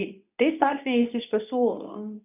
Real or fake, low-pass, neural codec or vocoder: fake; 3.6 kHz; codec, 24 kHz, 0.9 kbps, WavTokenizer, medium speech release version 2